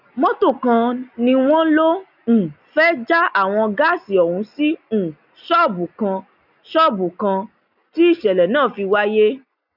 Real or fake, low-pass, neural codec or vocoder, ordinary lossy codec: real; 5.4 kHz; none; none